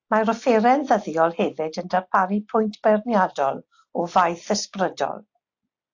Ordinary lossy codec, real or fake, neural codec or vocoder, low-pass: AAC, 48 kbps; real; none; 7.2 kHz